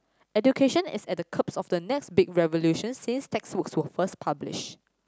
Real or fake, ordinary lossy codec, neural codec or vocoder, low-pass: real; none; none; none